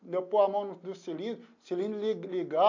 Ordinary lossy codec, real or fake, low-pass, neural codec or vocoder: AAC, 48 kbps; real; 7.2 kHz; none